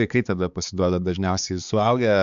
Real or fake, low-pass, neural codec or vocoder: fake; 7.2 kHz; codec, 16 kHz, 4 kbps, X-Codec, HuBERT features, trained on balanced general audio